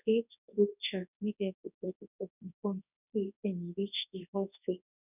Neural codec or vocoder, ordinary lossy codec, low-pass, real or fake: codec, 24 kHz, 0.9 kbps, WavTokenizer, large speech release; none; 3.6 kHz; fake